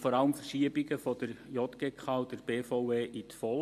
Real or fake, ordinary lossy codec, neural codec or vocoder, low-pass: real; MP3, 64 kbps; none; 14.4 kHz